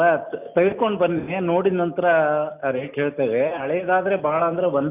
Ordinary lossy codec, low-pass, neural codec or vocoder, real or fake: none; 3.6 kHz; none; real